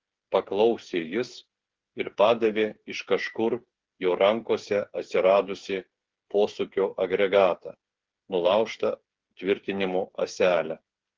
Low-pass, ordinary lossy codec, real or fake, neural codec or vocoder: 7.2 kHz; Opus, 16 kbps; fake; codec, 16 kHz, 8 kbps, FreqCodec, smaller model